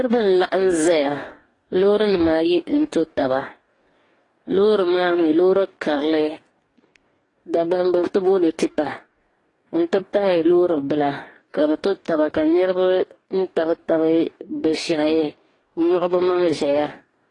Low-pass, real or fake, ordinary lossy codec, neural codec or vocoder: 10.8 kHz; fake; AAC, 32 kbps; codec, 44.1 kHz, 2.6 kbps, DAC